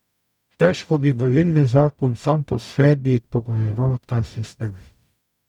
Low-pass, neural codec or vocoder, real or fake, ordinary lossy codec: 19.8 kHz; codec, 44.1 kHz, 0.9 kbps, DAC; fake; none